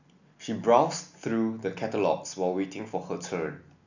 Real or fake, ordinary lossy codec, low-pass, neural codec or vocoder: real; none; 7.2 kHz; none